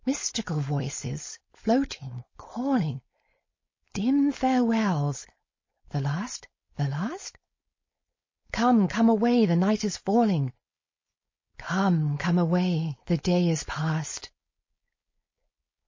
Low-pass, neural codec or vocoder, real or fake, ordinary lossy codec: 7.2 kHz; codec, 16 kHz, 4.8 kbps, FACodec; fake; MP3, 32 kbps